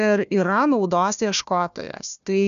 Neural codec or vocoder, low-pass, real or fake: codec, 16 kHz, 1 kbps, FunCodec, trained on Chinese and English, 50 frames a second; 7.2 kHz; fake